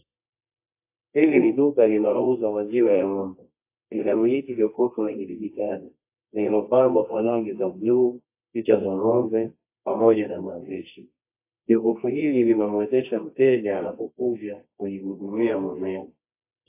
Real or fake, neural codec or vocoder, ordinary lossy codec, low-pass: fake; codec, 24 kHz, 0.9 kbps, WavTokenizer, medium music audio release; AAC, 24 kbps; 3.6 kHz